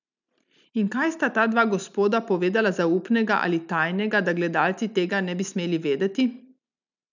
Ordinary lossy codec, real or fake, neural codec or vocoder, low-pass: none; real; none; 7.2 kHz